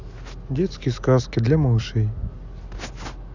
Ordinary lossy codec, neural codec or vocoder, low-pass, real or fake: none; none; 7.2 kHz; real